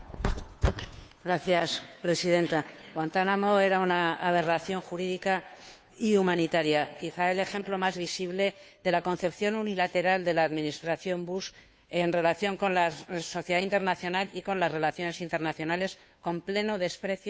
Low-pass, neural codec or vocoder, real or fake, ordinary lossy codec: none; codec, 16 kHz, 2 kbps, FunCodec, trained on Chinese and English, 25 frames a second; fake; none